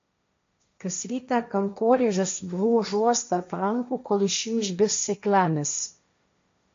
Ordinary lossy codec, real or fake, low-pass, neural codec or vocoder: MP3, 48 kbps; fake; 7.2 kHz; codec, 16 kHz, 1.1 kbps, Voila-Tokenizer